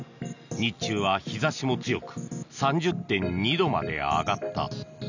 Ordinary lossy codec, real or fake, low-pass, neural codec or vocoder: none; real; 7.2 kHz; none